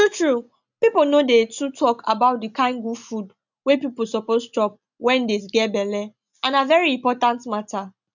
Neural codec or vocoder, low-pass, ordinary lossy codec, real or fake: none; 7.2 kHz; none; real